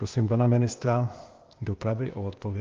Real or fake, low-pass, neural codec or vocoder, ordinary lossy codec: fake; 7.2 kHz; codec, 16 kHz, 0.8 kbps, ZipCodec; Opus, 32 kbps